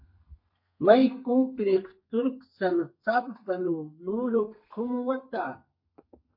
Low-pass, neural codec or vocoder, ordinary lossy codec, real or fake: 5.4 kHz; codec, 32 kHz, 1.9 kbps, SNAC; MP3, 32 kbps; fake